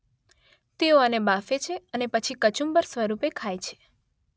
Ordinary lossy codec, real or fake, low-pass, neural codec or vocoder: none; real; none; none